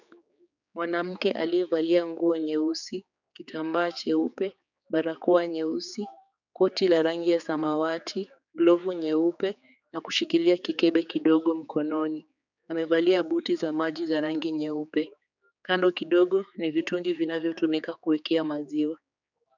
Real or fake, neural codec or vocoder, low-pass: fake; codec, 16 kHz, 4 kbps, X-Codec, HuBERT features, trained on general audio; 7.2 kHz